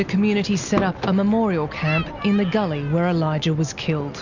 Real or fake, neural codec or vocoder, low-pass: real; none; 7.2 kHz